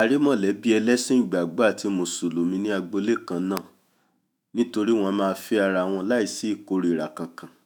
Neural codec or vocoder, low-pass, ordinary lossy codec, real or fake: autoencoder, 48 kHz, 128 numbers a frame, DAC-VAE, trained on Japanese speech; none; none; fake